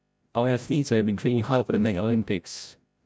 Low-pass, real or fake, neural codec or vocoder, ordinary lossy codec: none; fake; codec, 16 kHz, 0.5 kbps, FreqCodec, larger model; none